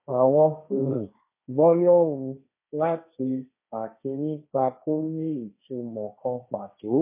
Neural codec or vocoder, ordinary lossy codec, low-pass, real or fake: codec, 16 kHz, 1.1 kbps, Voila-Tokenizer; MP3, 24 kbps; 3.6 kHz; fake